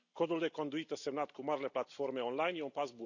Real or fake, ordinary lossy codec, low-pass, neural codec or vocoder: real; none; 7.2 kHz; none